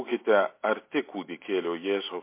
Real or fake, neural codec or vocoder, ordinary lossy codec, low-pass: real; none; MP3, 24 kbps; 3.6 kHz